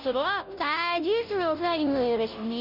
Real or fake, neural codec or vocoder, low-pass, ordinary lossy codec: fake; codec, 16 kHz, 0.5 kbps, FunCodec, trained on Chinese and English, 25 frames a second; 5.4 kHz; none